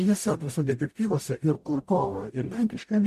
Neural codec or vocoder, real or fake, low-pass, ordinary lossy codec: codec, 44.1 kHz, 0.9 kbps, DAC; fake; 14.4 kHz; MP3, 64 kbps